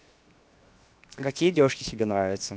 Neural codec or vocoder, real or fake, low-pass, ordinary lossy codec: codec, 16 kHz, 0.7 kbps, FocalCodec; fake; none; none